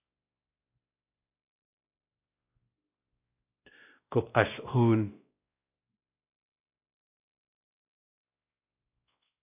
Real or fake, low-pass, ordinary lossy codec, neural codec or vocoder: fake; 3.6 kHz; AAC, 32 kbps; codec, 16 kHz, 1 kbps, X-Codec, WavLM features, trained on Multilingual LibriSpeech